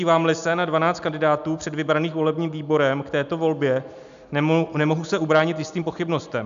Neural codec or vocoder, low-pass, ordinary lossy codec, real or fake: none; 7.2 kHz; AAC, 96 kbps; real